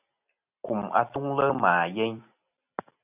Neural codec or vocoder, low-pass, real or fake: none; 3.6 kHz; real